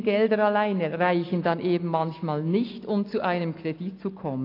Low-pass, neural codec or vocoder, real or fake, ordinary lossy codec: 5.4 kHz; none; real; AAC, 32 kbps